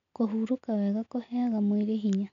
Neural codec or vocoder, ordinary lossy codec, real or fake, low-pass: none; none; real; 7.2 kHz